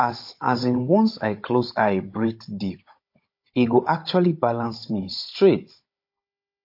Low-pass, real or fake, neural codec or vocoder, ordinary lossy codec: 5.4 kHz; fake; codec, 16 kHz, 16 kbps, FunCodec, trained on Chinese and English, 50 frames a second; MP3, 32 kbps